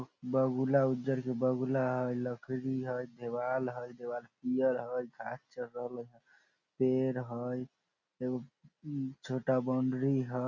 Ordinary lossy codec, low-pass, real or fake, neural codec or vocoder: none; 7.2 kHz; real; none